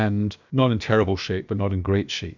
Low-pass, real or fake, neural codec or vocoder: 7.2 kHz; fake; codec, 16 kHz, about 1 kbps, DyCAST, with the encoder's durations